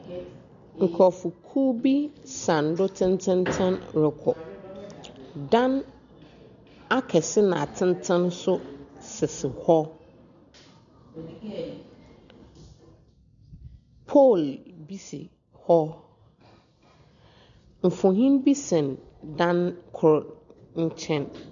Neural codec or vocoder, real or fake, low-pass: none; real; 7.2 kHz